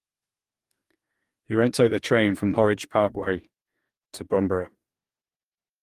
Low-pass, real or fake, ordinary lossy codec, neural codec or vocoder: 14.4 kHz; fake; Opus, 32 kbps; codec, 44.1 kHz, 2.6 kbps, DAC